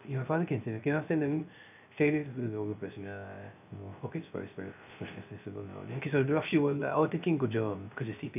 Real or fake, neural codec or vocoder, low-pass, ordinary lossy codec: fake; codec, 16 kHz, 0.3 kbps, FocalCodec; 3.6 kHz; none